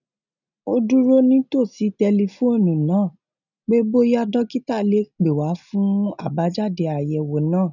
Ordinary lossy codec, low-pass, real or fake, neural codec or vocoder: none; 7.2 kHz; real; none